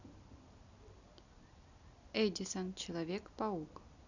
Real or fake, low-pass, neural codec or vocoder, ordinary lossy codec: real; 7.2 kHz; none; none